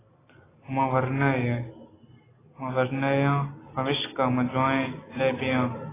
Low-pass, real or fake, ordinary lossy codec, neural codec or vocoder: 3.6 kHz; real; AAC, 16 kbps; none